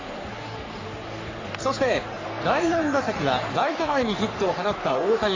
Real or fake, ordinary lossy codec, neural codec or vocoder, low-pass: fake; AAC, 32 kbps; codec, 44.1 kHz, 3.4 kbps, Pupu-Codec; 7.2 kHz